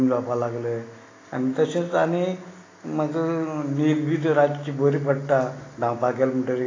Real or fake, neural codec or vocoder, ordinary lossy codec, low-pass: real; none; AAC, 32 kbps; 7.2 kHz